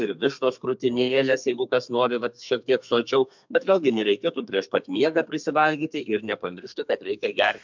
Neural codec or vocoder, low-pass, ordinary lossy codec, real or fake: codec, 32 kHz, 1.9 kbps, SNAC; 7.2 kHz; MP3, 64 kbps; fake